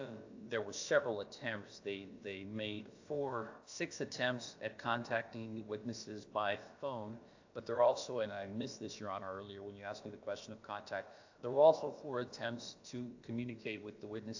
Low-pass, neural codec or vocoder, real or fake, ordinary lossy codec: 7.2 kHz; codec, 16 kHz, about 1 kbps, DyCAST, with the encoder's durations; fake; AAC, 48 kbps